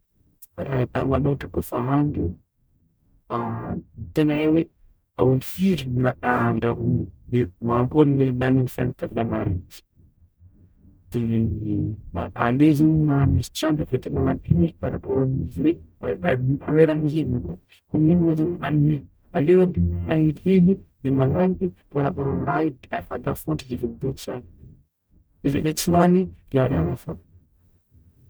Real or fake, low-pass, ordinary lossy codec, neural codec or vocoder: fake; none; none; codec, 44.1 kHz, 0.9 kbps, DAC